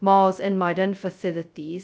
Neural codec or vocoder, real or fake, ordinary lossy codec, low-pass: codec, 16 kHz, 0.2 kbps, FocalCodec; fake; none; none